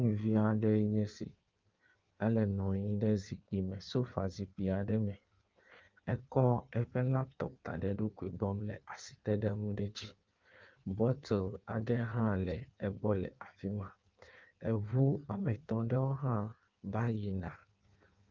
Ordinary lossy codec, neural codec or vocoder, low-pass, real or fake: Opus, 24 kbps; codec, 16 kHz in and 24 kHz out, 1.1 kbps, FireRedTTS-2 codec; 7.2 kHz; fake